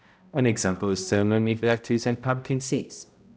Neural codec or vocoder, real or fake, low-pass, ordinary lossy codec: codec, 16 kHz, 0.5 kbps, X-Codec, HuBERT features, trained on balanced general audio; fake; none; none